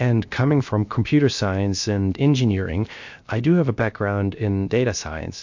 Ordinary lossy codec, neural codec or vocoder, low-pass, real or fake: MP3, 48 kbps; codec, 16 kHz, 0.7 kbps, FocalCodec; 7.2 kHz; fake